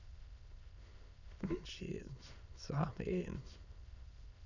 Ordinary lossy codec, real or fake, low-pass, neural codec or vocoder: none; fake; 7.2 kHz; autoencoder, 22.05 kHz, a latent of 192 numbers a frame, VITS, trained on many speakers